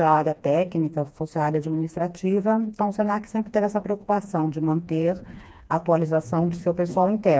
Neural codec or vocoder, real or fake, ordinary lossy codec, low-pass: codec, 16 kHz, 2 kbps, FreqCodec, smaller model; fake; none; none